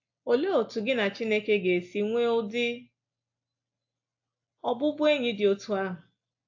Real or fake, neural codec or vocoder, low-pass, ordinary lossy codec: real; none; 7.2 kHz; AAC, 48 kbps